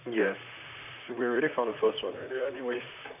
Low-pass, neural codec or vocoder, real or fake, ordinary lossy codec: 3.6 kHz; vocoder, 44.1 kHz, 128 mel bands, Pupu-Vocoder; fake; none